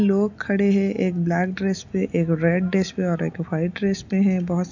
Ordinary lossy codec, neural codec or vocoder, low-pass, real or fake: none; none; 7.2 kHz; real